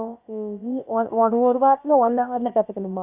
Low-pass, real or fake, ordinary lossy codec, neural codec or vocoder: 3.6 kHz; fake; none; codec, 16 kHz, about 1 kbps, DyCAST, with the encoder's durations